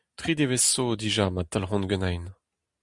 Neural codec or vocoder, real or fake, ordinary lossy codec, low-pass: none; real; Opus, 64 kbps; 10.8 kHz